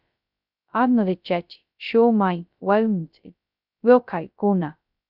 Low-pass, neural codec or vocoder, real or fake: 5.4 kHz; codec, 16 kHz, 0.2 kbps, FocalCodec; fake